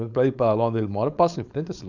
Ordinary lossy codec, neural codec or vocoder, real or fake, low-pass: none; codec, 16 kHz, 4.8 kbps, FACodec; fake; 7.2 kHz